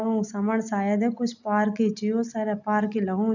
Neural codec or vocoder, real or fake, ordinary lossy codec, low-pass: none; real; none; 7.2 kHz